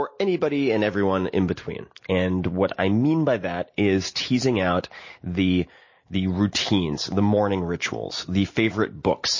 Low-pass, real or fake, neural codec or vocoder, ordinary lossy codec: 7.2 kHz; real; none; MP3, 32 kbps